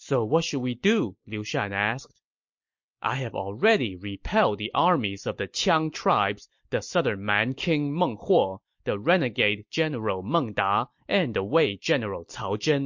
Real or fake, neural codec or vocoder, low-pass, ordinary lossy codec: real; none; 7.2 kHz; MP3, 48 kbps